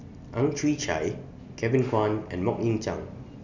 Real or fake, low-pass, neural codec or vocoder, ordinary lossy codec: real; 7.2 kHz; none; none